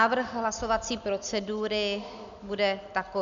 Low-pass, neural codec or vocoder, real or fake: 7.2 kHz; none; real